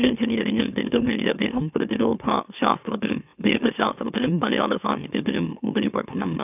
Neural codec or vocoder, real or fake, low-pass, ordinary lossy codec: autoencoder, 44.1 kHz, a latent of 192 numbers a frame, MeloTTS; fake; 3.6 kHz; none